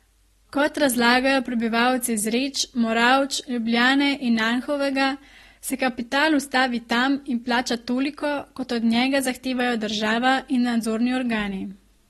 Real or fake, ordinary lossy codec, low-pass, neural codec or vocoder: real; AAC, 32 kbps; 19.8 kHz; none